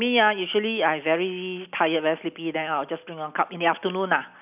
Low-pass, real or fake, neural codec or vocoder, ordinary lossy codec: 3.6 kHz; real; none; none